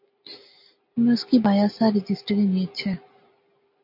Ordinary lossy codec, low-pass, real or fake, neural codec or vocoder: MP3, 32 kbps; 5.4 kHz; real; none